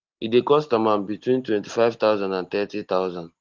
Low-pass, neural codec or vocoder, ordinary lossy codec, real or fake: 7.2 kHz; none; Opus, 16 kbps; real